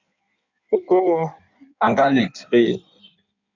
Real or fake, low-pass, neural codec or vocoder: fake; 7.2 kHz; codec, 16 kHz in and 24 kHz out, 1.1 kbps, FireRedTTS-2 codec